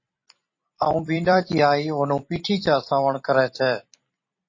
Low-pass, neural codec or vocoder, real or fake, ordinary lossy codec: 7.2 kHz; vocoder, 44.1 kHz, 128 mel bands every 256 samples, BigVGAN v2; fake; MP3, 32 kbps